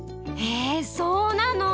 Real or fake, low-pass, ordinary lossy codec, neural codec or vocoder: real; none; none; none